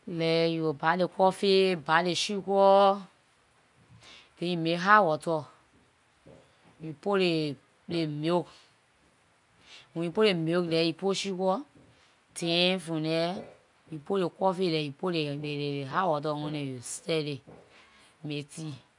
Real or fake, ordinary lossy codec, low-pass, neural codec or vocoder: real; none; 10.8 kHz; none